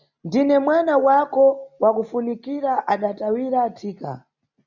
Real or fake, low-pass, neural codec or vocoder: real; 7.2 kHz; none